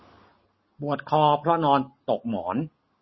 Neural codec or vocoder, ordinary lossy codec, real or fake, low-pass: none; MP3, 24 kbps; real; 7.2 kHz